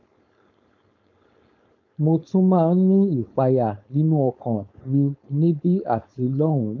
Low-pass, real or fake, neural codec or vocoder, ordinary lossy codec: 7.2 kHz; fake; codec, 16 kHz, 4.8 kbps, FACodec; AAC, 48 kbps